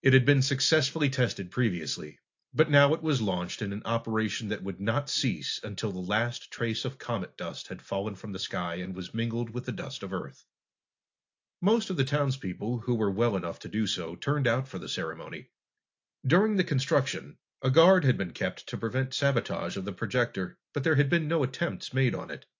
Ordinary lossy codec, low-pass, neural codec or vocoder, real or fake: AAC, 48 kbps; 7.2 kHz; none; real